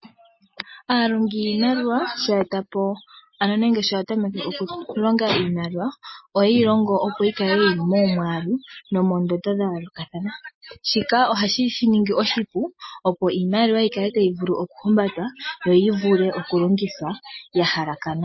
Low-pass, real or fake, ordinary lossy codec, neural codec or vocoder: 7.2 kHz; real; MP3, 24 kbps; none